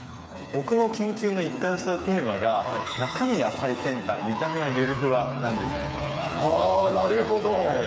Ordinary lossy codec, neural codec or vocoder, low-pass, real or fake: none; codec, 16 kHz, 4 kbps, FreqCodec, smaller model; none; fake